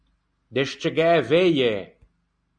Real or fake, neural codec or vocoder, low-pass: real; none; 9.9 kHz